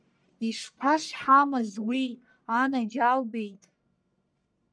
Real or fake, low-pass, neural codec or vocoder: fake; 9.9 kHz; codec, 44.1 kHz, 1.7 kbps, Pupu-Codec